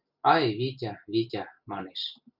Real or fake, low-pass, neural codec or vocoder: real; 5.4 kHz; none